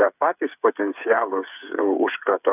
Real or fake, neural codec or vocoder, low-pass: fake; codec, 16 kHz, 8 kbps, FreqCodec, smaller model; 3.6 kHz